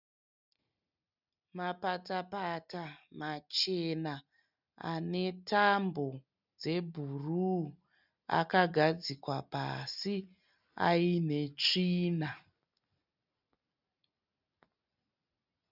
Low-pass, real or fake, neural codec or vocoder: 5.4 kHz; real; none